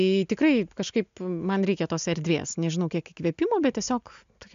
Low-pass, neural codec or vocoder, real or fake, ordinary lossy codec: 7.2 kHz; none; real; MP3, 64 kbps